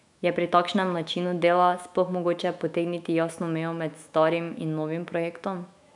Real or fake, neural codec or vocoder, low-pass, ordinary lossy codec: fake; autoencoder, 48 kHz, 128 numbers a frame, DAC-VAE, trained on Japanese speech; 10.8 kHz; none